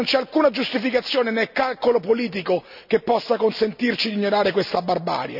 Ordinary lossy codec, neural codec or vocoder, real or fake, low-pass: none; none; real; 5.4 kHz